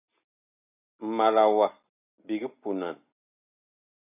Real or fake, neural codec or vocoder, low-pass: real; none; 3.6 kHz